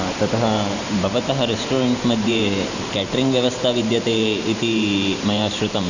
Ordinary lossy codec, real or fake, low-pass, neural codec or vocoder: none; fake; 7.2 kHz; vocoder, 44.1 kHz, 128 mel bands every 512 samples, BigVGAN v2